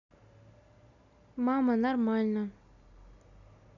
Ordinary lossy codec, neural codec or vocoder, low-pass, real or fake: none; none; 7.2 kHz; real